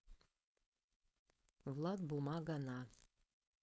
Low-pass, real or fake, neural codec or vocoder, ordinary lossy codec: none; fake; codec, 16 kHz, 4.8 kbps, FACodec; none